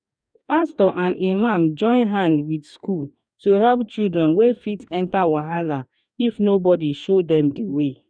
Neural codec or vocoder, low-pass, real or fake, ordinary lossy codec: codec, 44.1 kHz, 2.6 kbps, DAC; 9.9 kHz; fake; none